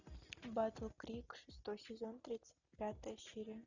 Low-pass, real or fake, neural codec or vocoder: 7.2 kHz; real; none